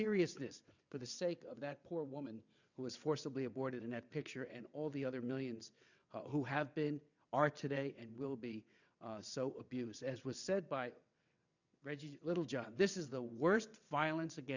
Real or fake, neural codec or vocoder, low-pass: fake; vocoder, 22.05 kHz, 80 mel bands, WaveNeXt; 7.2 kHz